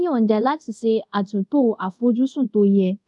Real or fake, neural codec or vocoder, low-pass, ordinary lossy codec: fake; codec, 24 kHz, 0.5 kbps, DualCodec; 10.8 kHz; none